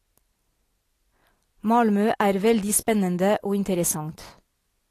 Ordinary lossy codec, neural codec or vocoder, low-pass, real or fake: AAC, 48 kbps; none; 14.4 kHz; real